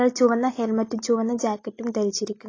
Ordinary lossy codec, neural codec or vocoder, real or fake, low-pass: AAC, 32 kbps; none; real; 7.2 kHz